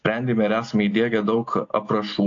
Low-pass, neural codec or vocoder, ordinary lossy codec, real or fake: 7.2 kHz; none; AAC, 48 kbps; real